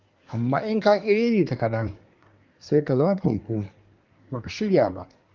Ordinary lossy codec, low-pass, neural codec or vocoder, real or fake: Opus, 32 kbps; 7.2 kHz; codec, 24 kHz, 1 kbps, SNAC; fake